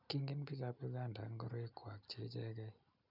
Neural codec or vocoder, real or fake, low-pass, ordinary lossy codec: none; real; 5.4 kHz; none